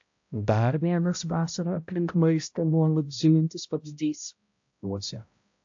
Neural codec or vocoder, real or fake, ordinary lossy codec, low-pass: codec, 16 kHz, 0.5 kbps, X-Codec, HuBERT features, trained on balanced general audio; fake; AAC, 64 kbps; 7.2 kHz